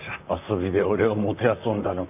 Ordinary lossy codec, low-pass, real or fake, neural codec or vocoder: none; 3.6 kHz; fake; vocoder, 44.1 kHz, 128 mel bands, Pupu-Vocoder